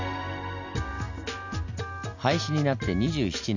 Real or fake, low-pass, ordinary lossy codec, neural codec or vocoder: real; 7.2 kHz; none; none